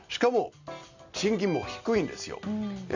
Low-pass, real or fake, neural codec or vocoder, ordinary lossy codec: 7.2 kHz; real; none; Opus, 64 kbps